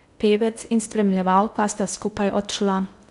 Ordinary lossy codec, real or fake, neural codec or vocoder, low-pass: none; fake; codec, 16 kHz in and 24 kHz out, 0.6 kbps, FocalCodec, streaming, 2048 codes; 10.8 kHz